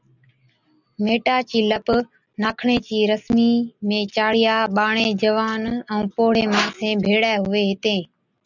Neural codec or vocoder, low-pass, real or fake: none; 7.2 kHz; real